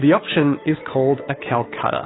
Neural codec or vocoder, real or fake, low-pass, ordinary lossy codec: codec, 16 kHz, 2 kbps, FunCodec, trained on Chinese and English, 25 frames a second; fake; 7.2 kHz; AAC, 16 kbps